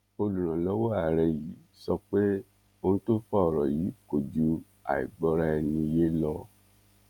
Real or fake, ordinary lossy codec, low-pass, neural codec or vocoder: fake; none; 19.8 kHz; vocoder, 48 kHz, 128 mel bands, Vocos